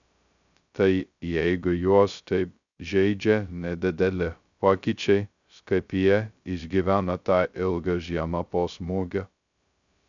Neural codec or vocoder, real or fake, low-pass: codec, 16 kHz, 0.2 kbps, FocalCodec; fake; 7.2 kHz